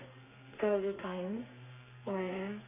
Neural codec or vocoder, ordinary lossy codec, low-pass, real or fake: codec, 32 kHz, 1.9 kbps, SNAC; MP3, 24 kbps; 3.6 kHz; fake